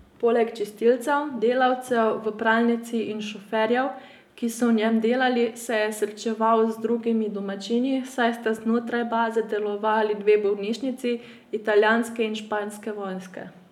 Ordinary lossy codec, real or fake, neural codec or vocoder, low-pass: none; real; none; 19.8 kHz